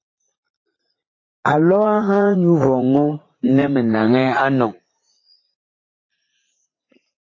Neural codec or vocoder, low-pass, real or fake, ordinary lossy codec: vocoder, 44.1 kHz, 80 mel bands, Vocos; 7.2 kHz; fake; AAC, 32 kbps